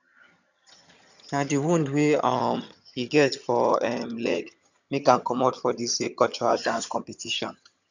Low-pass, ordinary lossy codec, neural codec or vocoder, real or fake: 7.2 kHz; none; vocoder, 22.05 kHz, 80 mel bands, HiFi-GAN; fake